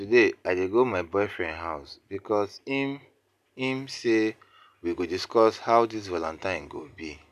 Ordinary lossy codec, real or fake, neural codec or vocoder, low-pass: none; real; none; 14.4 kHz